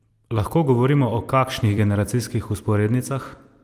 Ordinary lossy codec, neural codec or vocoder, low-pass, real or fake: Opus, 32 kbps; vocoder, 44.1 kHz, 128 mel bands every 256 samples, BigVGAN v2; 14.4 kHz; fake